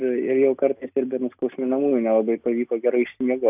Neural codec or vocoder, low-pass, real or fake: none; 3.6 kHz; real